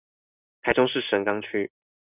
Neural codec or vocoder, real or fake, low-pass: none; real; 3.6 kHz